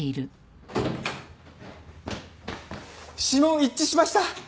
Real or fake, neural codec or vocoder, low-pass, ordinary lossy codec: real; none; none; none